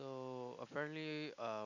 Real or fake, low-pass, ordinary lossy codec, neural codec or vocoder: real; 7.2 kHz; none; none